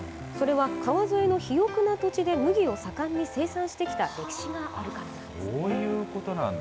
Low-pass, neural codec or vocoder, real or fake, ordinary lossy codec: none; none; real; none